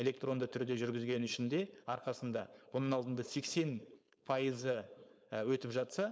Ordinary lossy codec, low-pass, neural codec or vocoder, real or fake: none; none; codec, 16 kHz, 4.8 kbps, FACodec; fake